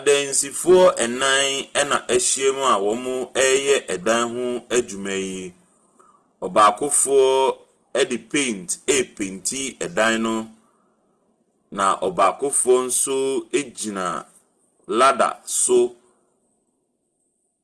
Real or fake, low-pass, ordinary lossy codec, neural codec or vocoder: real; 10.8 kHz; Opus, 16 kbps; none